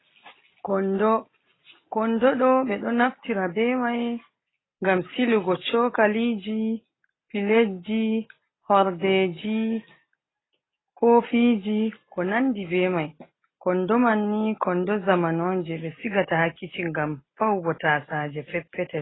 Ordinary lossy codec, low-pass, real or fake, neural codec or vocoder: AAC, 16 kbps; 7.2 kHz; real; none